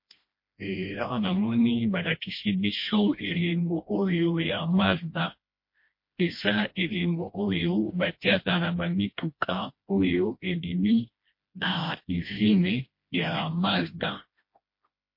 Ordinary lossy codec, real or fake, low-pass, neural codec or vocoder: MP3, 32 kbps; fake; 5.4 kHz; codec, 16 kHz, 1 kbps, FreqCodec, smaller model